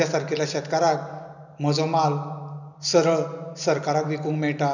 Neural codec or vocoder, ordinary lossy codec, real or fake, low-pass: none; none; real; 7.2 kHz